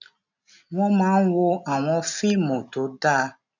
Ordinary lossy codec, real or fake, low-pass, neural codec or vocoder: none; real; 7.2 kHz; none